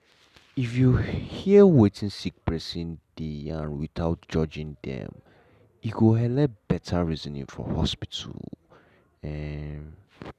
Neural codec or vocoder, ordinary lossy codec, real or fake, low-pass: none; none; real; 14.4 kHz